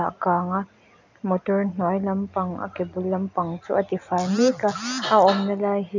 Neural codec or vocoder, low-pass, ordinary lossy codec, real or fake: none; 7.2 kHz; none; real